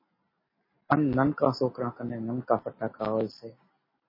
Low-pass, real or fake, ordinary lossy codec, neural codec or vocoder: 5.4 kHz; real; MP3, 24 kbps; none